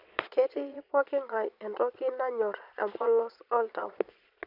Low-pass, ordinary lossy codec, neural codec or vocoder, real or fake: 5.4 kHz; none; vocoder, 22.05 kHz, 80 mel bands, Vocos; fake